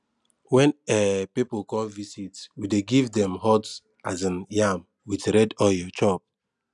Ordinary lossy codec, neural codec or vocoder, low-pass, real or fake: none; none; 10.8 kHz; real